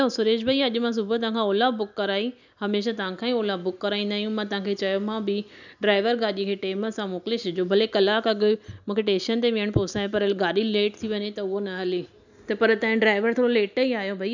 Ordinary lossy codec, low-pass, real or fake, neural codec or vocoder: none; 7.2 kHz; real; none